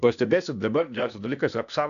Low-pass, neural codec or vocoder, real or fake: 7.2 kHz; codec, 16 kHz, 0.8 kbps, ZipCodec; fake